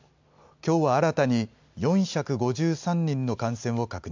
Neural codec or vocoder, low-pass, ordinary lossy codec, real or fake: none; 7.2 kHz; MP3, 64 kbps; real